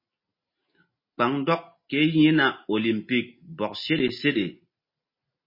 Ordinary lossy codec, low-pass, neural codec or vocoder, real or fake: MP3, 24 kbps; 5.4 kHz; none; real